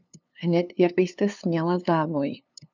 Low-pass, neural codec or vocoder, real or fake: 7.2 kHz; codec, 16 kHz, 2 kbps, FunCodec, trained on LibriTTS, 25 frames a second; fake